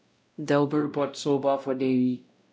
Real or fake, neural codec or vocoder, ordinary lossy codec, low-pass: fake; codec, 16 kHz, 0.5 kbps, X-Codec, WavLM features, trained on Multilingual LibriSpeech; none; none